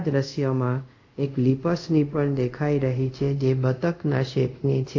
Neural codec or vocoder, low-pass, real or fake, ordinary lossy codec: codec, 24 kHz, 0.5 kbps, DualCodec; 7.2 kHz; fake; AAC, 48 kbps